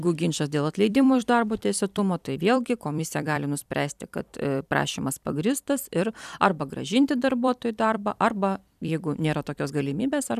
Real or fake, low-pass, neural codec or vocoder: fake; 14.4 kHz; vocoder, 44.1 kHz, 128 mel bands every 256 samples, BigVGAN v2